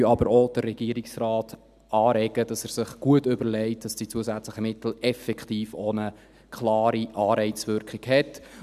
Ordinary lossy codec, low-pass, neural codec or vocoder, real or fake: none; 14.4 kHz; none; real